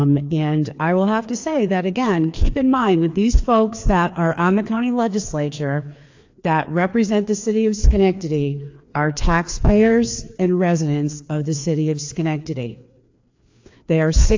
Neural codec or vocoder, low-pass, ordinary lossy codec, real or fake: codec, 16 kHz, 2 kbps, FreqCodec, larger model; 7.2 kHz; AAC, 48 kbps; fake